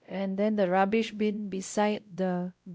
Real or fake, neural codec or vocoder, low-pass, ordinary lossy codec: fake; codec, 16 kHz, 0.5 kbps, X-Codec, WavLM features, trained on Multilingual LibriSpeech; none; none